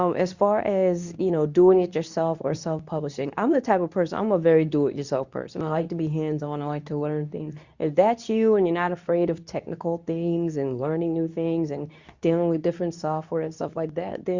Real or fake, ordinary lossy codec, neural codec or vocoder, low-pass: fake; Opus, 64 kbps; codec, 24 kHz, 0.9 kbps, WavTokenizer, medium speech release version 2; 7.2 kHz